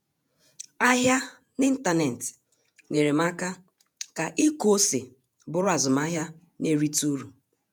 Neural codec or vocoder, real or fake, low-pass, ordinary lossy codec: vocoder, 48 kHz, 128 mel bands, Vocos; fake; none; none